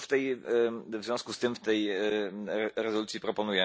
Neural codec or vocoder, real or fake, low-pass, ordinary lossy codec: none; real; none; none